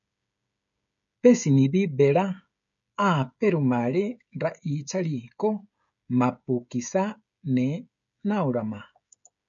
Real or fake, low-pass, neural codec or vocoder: fake; 7.2 kHz; codec, 16 kHz, 16 kbps, FreqCodec, smaller model